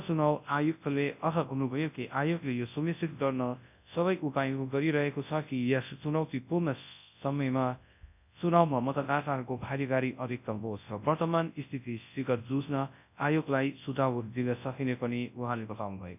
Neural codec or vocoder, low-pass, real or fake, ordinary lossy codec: codec, 24 kHz, 0.9 kbps, WavTokenizer, large speech release; 3.6 kHz; fake; none